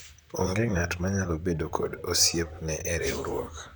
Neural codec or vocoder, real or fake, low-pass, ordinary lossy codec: vocoder, 44.1 kHz, 128 mel bands, Pupu-Vocoder; fake; none; none